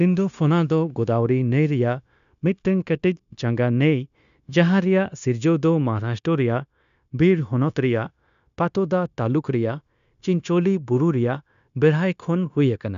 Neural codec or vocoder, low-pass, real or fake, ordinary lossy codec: codec, 16 kHz, 0.9 kbps, LongCat-Audio-Codec; 7.2 kHz; fake; none